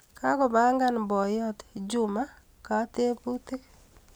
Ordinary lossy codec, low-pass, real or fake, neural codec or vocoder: none; none; real; none